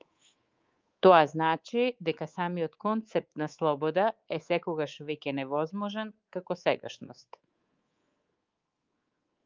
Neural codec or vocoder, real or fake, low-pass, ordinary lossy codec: codec, 24 kHz, 3.1 kbps, DualCodec; fake; 7.2 kHz; Opus, 24 kbps